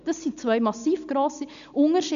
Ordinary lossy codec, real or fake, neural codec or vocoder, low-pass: none; real; none; 7.2 kHz